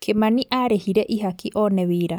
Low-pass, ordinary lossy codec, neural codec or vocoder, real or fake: none; none; none; real